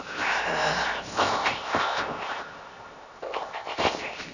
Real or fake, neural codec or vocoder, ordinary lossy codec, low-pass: fake; codec, 16 kHz, 0.7 kbps, FocalCodec; none; 7.2 kHz